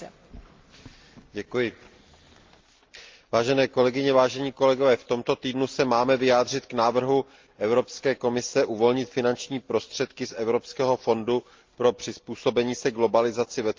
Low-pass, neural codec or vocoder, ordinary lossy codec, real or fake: 7.2 kHz; none; Opus, 32 kbps; real